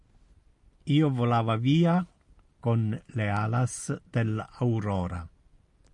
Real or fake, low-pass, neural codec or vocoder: real; 10.8 kHz; none